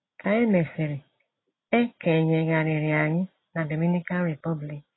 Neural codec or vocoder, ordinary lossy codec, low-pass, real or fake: none; AAC, 16 kbps; 7.2 kHz; real